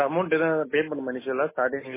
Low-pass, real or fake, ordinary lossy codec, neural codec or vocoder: 3.6 kHz; real; MP3, 16 kbps; none